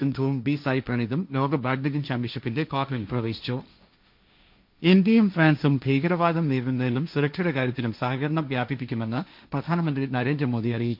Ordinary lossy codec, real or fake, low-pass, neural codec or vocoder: MP3, 48 kbps; fake; 5.4 kHz; codec, 16 kHz, 1.1 kbps, Voila-Tokenizer